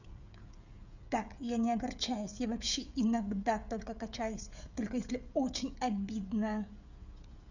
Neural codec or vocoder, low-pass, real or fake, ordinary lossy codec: codec, 16 kHz, 16 kbps, FreqCodec, smaller model; 7.2 kHz; fake; none